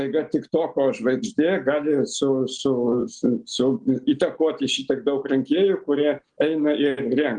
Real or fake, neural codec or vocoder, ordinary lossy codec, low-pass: real; none; Opus, 24 kbps; 9.9 kHz